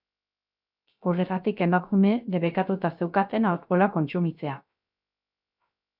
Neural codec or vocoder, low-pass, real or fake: codec, 16 kHz, 0.3 kbps, FocalCodec; 5.4 kHz; fake